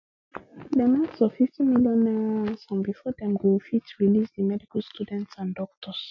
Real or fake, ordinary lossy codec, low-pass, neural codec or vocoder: real; none; 7.2 kHz; none